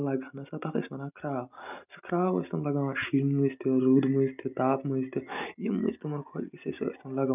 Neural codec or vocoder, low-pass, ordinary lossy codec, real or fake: none; 3.6 kHz; none; real